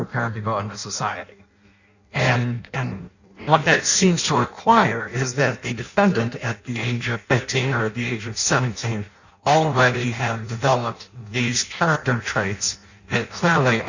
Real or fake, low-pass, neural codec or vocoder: fake; 7.2 kHz; codec, 16 kHz in and 24 kHz out, 0.6 kbps, FireRedTTS-2 codec